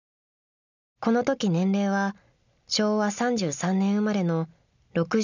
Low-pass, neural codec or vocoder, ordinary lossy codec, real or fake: 7.2 kHz; none; none; real